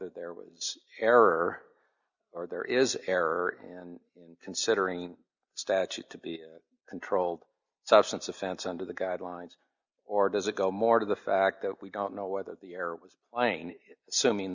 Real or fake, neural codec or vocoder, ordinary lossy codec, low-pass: real; none; Opus, 64 kbps; 7.2 kHz